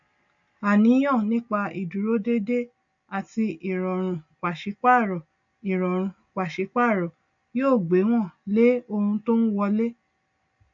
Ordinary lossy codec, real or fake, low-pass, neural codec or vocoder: AAC, 64 kbps; real; 7.2 kHz; none